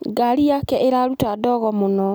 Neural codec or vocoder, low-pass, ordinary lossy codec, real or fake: none; none; none; real